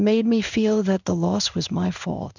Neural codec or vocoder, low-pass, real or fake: codec, 16 kHz in and 24 kHz out, 1 kbps, XY-Tokenizer; 7.2 kHz; fake